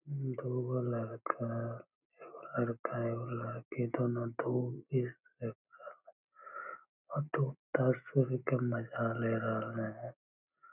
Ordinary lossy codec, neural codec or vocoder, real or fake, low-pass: none; none; real; 3.6 kHz